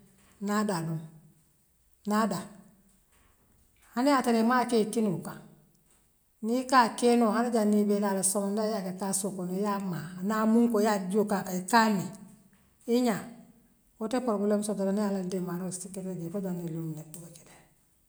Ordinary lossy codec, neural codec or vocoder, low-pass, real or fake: none; none; none; real